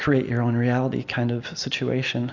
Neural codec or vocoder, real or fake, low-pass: none; real; 7.2 kHz